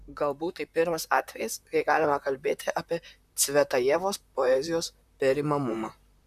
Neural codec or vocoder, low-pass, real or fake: vocoder, 44.1 kHz, 128 mel bands, Pupu-Vocoder; 14.4 kHz; fake